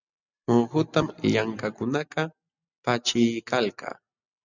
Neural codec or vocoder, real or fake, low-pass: none; real; 7.2 kHz